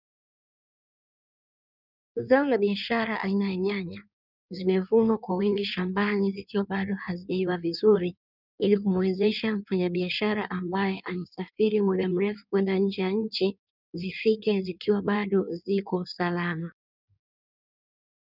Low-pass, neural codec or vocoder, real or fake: 5.4 kHz; codec, 16 kHz in and 24 kHz out, 1.1 kbps, FireRedTTS-2 codec; fake